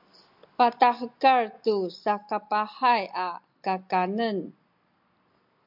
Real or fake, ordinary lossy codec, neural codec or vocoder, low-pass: real; MP3, 48 kbps; none; 5.4 kHz